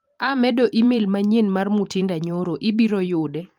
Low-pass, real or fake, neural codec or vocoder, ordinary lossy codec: 19.8 kHz; fake; vocoder, 44.1 kHz, 128 mel bands every 512 samples, BigVGAN v2; Opus, 32 kbps